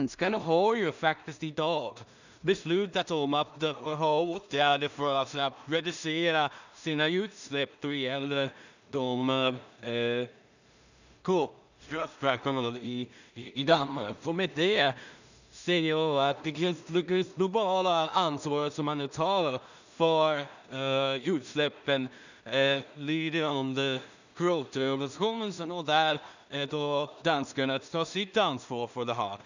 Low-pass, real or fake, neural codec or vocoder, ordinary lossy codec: 7.2 kHz; fake; codec, 16 kHz in and 24 kHz out, 0.4 kbps, LongCat-Audio-Codec, two codebook decoder; none